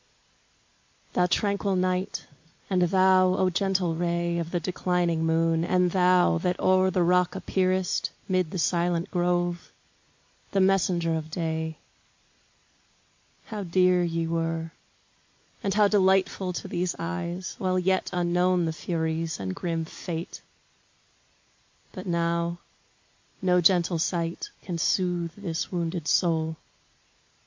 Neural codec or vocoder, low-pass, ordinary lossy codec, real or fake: none; 7.2 kHz; MP3, 48 kbps; real